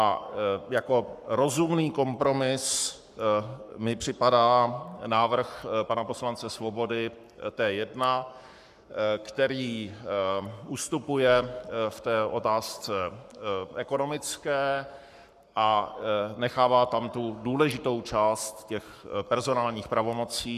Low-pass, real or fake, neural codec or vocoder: 14.4 kHz; fake; codec, 44.1 kHz, 7.8 kbps, Pupu-Codec